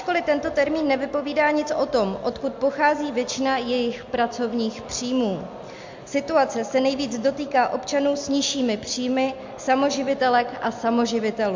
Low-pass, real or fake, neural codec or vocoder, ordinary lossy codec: 7.2 kHz; real; none; MP3, 48 kbps